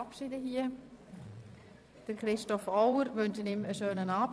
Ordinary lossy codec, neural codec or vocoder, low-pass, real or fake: none; vocoder, 22.05 kHz, 80 mel bands, Vocos; none; fake